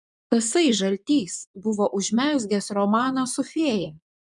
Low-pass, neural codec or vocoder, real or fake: 10.8 kHz; vocoder, 24 kHz, 100 mel bands, Vocos; fake